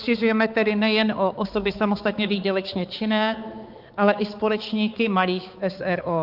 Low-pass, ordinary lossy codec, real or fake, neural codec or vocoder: 5.4 kHz; Opus, 32 kbps; fake; codec, 16 kHz, 4 kbps, X-Codec, HuBERT features, trained on balanced general audio